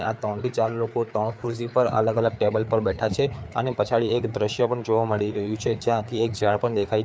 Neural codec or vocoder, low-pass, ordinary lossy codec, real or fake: codec, 16 kHz, 4 kbps, FreqCodec, larger model; none; none; fake